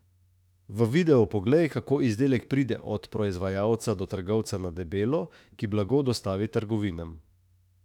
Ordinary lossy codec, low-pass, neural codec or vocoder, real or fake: none; 19.8 kHz; autoencoder, 48 kHz, 32 numbers a frame, DAC-VAE, trained on Japanese speech; fake